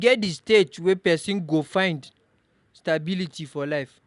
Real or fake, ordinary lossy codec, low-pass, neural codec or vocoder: real; none; 10.8 kHz; none